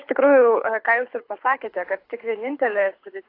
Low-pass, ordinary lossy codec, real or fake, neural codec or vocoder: 5.4 kHz; AAC, 32 kbps; fake; codec, 24 kHz, 6 kbps, HILCodec